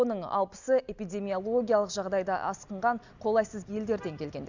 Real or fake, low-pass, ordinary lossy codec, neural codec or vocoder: real; 7.2 kHz; Opus, 64 kbps; none